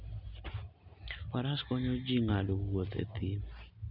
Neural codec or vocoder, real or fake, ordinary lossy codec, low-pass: none; real; none; 5.4 kHz